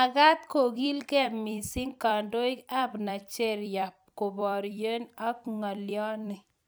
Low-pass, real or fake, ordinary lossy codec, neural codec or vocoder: none; fake; none; vocoder, 44.1 kHz, 128 mel bands every 256 samples, BigVGAN v2